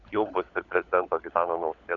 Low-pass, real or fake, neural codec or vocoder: 7.2 kHz; fake; codec, 16 kHz, 16 kbps, FunCodec, trained on LibriTTS, 50 frames a second